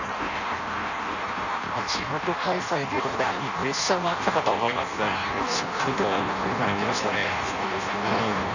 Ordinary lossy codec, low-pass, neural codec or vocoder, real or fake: none; 7.2 kHz; codec, 16 kHz in and 24 kHz out, 0.6 kbps, FireRedTTS-2 codec; fake